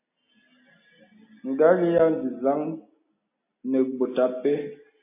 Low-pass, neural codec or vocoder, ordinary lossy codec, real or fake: 3.6 kHz; none; AAC, 24 kbps; real